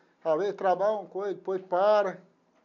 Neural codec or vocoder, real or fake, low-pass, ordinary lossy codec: none; real; 7.2 kHz; none